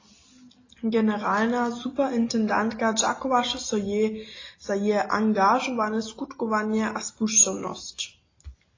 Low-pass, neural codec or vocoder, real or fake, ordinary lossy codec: 7.2 kHz; none; real; AAC, 32 kbps